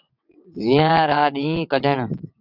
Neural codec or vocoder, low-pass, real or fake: vocoder, 22.05 kHz, 80 mel bands, WaveNeXt; 5.4 kHz; fake